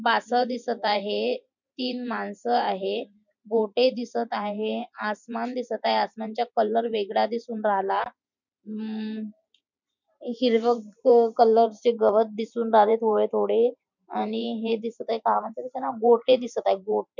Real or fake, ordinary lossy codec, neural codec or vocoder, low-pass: fake; none; vocoder, 44.1 kHz, 128 mel bands every 512 samples, BigVGAN v2; 7.2 kHz